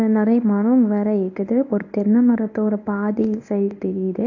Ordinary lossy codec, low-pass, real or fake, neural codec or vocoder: AAC, 48 kbps; 7.2 kHz; fake; codec, 24 kHz, 0.9 kbps, WavTokenizer, medium speech release version 1